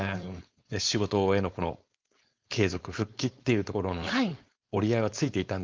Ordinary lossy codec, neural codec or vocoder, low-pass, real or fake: Opus, 32 kbps; codec, 16 kHz, 4.8 kbps, FACodec; 7.2 kHz; fake